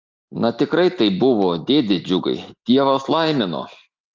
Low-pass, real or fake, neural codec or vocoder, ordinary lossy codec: 7.2 kHz; real; none; Opus, 32 kbps